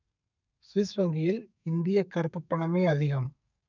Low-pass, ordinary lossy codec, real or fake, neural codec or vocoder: 7.2 kHz; none; fake; codec, 32 kHz, 1.9 kbps, SNAC